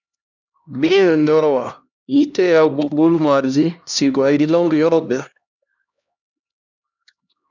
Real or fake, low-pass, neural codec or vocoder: fake; 7.2 kHz; codec, 16 kHz, 1 kbps, X-Codec, HuBERT features, trained on LibriSpeech